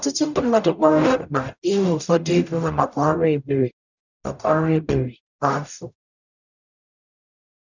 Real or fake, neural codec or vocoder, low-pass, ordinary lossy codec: fake; codec, 44.1 kHz, 0.9 kbps, DAC; 7.2 kHz; none